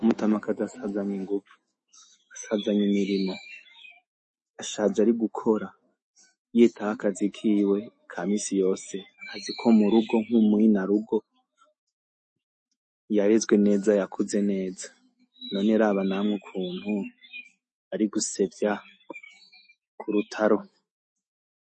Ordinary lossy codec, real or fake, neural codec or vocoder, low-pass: MP3, 32 kbps; fake; autoencoder, 48 kHz, 128 numbers a frame, DAC-VAE, trained on Japanese speech; 10.8 kHz